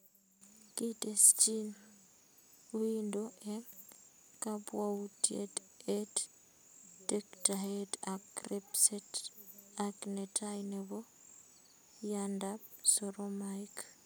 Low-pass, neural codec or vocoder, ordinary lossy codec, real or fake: none; none; none; real